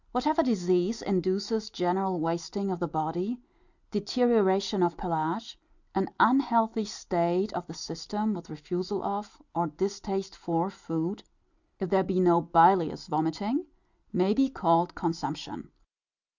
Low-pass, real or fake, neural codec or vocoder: 7.2 kHz; real; none